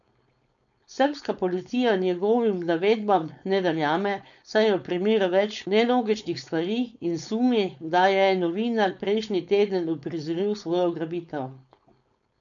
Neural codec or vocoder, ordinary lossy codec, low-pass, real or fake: codec, 16 kHz, 4.8 kbps, FACodec; none; 7.2 kHz; fake